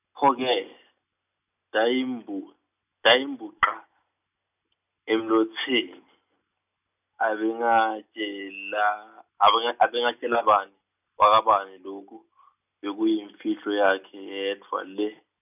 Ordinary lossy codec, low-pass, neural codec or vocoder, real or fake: none; 3.6 kHz; none; real